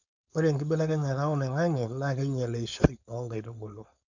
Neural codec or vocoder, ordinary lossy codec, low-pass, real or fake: codec, 16 kHz, 4.8 kbps, FACodec; none; 7.2 kHz; fake